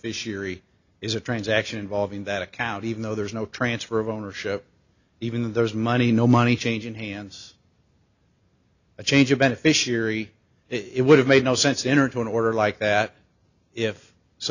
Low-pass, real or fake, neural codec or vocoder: 7.2 kHz; real; none